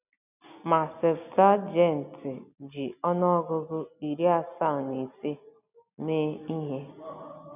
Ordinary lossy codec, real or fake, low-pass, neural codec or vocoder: none; real; 3.6 kHz; none